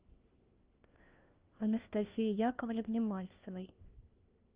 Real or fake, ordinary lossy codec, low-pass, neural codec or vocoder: fake; Opus, 24 kbps; 3.6 kHz; codec, 16 kHz, 1 kbps, FunCodec, trained on LibriTTS, 50 frames a second